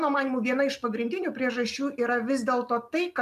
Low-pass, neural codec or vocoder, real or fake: 14.4 kHz; none; real